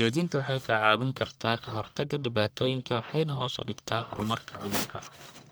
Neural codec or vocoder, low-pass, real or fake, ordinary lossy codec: codec, 44.1 kHz, 1.7 kbps, Pupu-Codec; none; fake; none